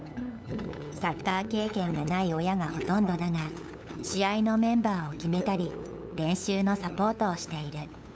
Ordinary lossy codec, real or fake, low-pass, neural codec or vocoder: none; fake; none; codec, 16 kHz, 8 kbps, FunCodec, trained on LibriTTS, 25 frames a second